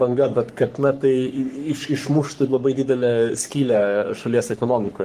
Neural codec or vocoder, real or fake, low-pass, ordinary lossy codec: codec, 44.1 kHz, 3.4 kbps, Pupu-Codec; fake; 14.4 kHz; Opus, 24 kbps